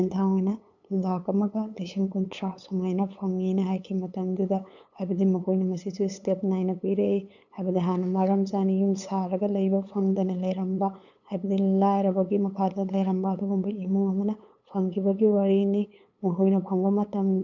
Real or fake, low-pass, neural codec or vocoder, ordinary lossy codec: fake; 7.2 kHz; codec, 16 kHz, 8 kbps, FunCodec, trained on LibriTTS, 25 frames a second; none